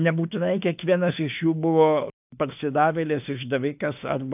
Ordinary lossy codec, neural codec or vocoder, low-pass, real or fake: AAC, 32 kbps; autoencoder, 48 kHz, 32 numbers a frame, DAC-VAE, trained on Japanese speech; 3.6 kHz; fake